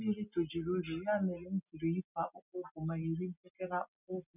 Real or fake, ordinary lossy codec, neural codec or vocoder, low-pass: real; none; none; 3.6 kHz